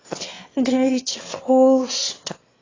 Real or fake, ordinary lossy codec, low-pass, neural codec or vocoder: fake; AAC, 32 kbps; 7.2 kHz; autoencoder, 22.05 kHz, a latent of 192 numbers a frame, VITS, trained on one speaker